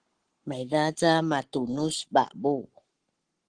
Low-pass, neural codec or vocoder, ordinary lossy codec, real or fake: 9.9 kHz; codec, 44.1 kHz, 7.8 kbps, Pupu-Codec; Opus, 16 kbps; fake